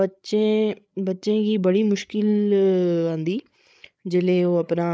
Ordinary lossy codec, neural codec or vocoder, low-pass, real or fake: none; codec, 16 kHz, 8 kbps, FreqCodec, larger model; none; fake